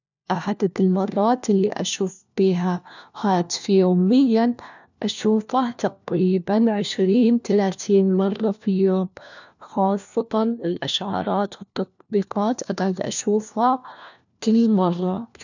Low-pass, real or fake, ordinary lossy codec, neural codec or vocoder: 7.2 kHz; fake; none; codec, 16 kHz, 1 kbps, FunCodec, trained on LibriTTS, 50 frames a second